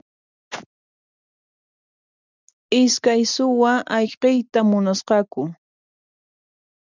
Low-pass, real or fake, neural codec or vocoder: 7.2 kHz; real; none